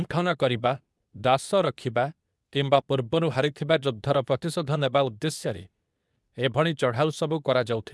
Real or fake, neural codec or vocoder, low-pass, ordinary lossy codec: fake; codec, 24 kHz, 0.9 kbps, WavTokenizer, medium speech release version 2; none; none